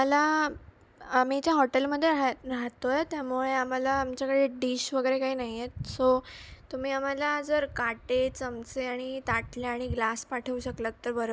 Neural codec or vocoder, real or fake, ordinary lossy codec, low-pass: none; real; none; none